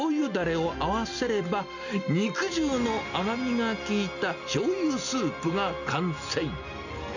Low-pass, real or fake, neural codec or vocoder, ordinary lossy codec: 7.2 kHz; real; none; none